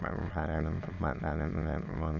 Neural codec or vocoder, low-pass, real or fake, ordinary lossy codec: autoencoder, 22.05 kHz, a latent of 192 numbers a frame, VITS, trained on many speakers; 7.2 kHz; fake; none